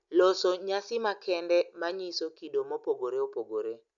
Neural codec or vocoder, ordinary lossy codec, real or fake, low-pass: none; none; real; 7.2 kHz